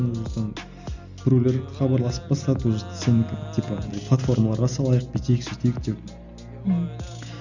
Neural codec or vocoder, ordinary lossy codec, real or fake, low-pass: none; none; real; 7.2 kHz